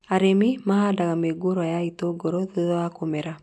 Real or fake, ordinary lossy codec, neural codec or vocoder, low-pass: real; none; none; none